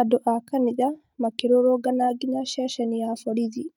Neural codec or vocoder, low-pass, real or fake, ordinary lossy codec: none; 19.8 kHz; real; none